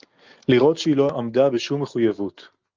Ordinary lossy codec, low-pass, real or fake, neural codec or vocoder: Opus, 24 kbps; 7.2 kHz; real; none